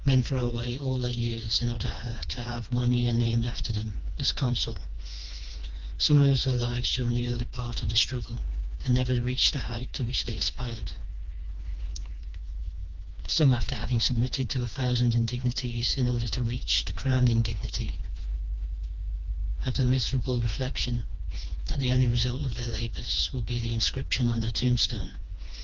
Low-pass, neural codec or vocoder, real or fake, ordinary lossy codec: 7.2 kHz; codec, 16 kHz, 2 kbps, FreqCodec, smaller model; fake; Opus, 24 kbps